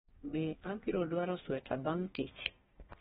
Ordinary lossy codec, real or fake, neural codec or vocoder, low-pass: AAC, 16 kbps; fake; codec, 32 kHz, 1.9 kbps, SNAC; 14.4 kHz